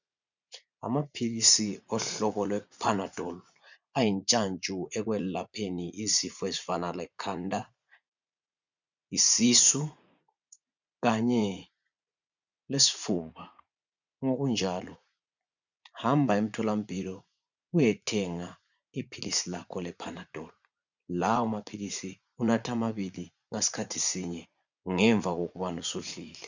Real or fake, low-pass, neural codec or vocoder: fake; 7.2 kHz; vocoder, 44.1 kHz, 80 mel bands, Vocos